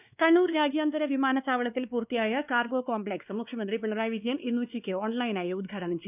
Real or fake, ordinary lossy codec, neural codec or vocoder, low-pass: fake; none; codec, 16 kHz, 2 kbps, X-Codec, WavLM features, trained on Multilingual LibriSpeech; 3.6 kHz